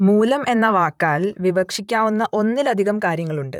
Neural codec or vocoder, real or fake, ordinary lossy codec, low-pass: vocoder, 44.1 kHz, 128 mel bands, Pupu-Vocoder; fake; none; 19.8 kHz